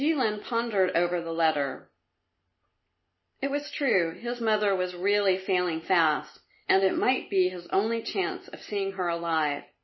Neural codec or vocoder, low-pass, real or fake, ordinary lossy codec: none; 7.2 kHz; real; MP3, 24 kbps